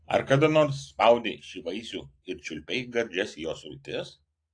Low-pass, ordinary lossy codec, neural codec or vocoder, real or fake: 9.9 kHz; AAC, 48 kbps; none; real